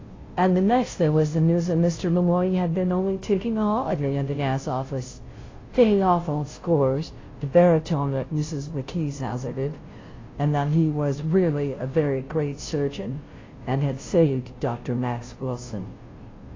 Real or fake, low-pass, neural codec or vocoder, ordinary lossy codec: fake; 7.2 kHz; codec, 16 kHz, 0.5 kbps, FunCodec, trained on Chinese and English, 25 frames a second; AAC, 32 kbps